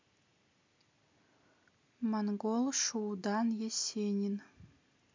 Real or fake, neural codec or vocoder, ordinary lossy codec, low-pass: real; none; MP3, 64 kbps; 7.2 kHz